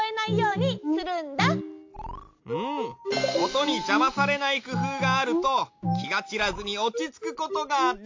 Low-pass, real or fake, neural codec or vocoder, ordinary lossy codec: 7.2 kHz; real; none; AAC, 48 kbps